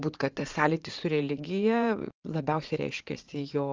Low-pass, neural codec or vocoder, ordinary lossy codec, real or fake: 7.2 kHz; vocoder, 44.1 kHz, 80 mel bands, Vocos; Opus, 32 kbps; fake